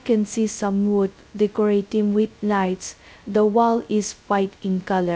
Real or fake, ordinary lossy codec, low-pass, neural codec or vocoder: fake; none; none; codec, 16 kHz, 0.2 kbps, FocalCodec